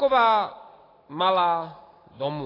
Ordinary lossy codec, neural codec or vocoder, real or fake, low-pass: AAC, 24 kbps; none; real; 5.4 kHz